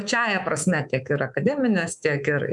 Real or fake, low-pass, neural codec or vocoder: fake; 10.8 kHz; codec, 24 kHz, 3.1 kbps, DualCodec